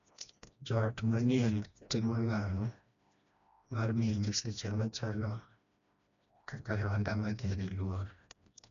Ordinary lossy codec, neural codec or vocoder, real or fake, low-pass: none; codec, 16 kHz, 1 kbps, FreqCodec, smaller model; fake; 7.2 kHz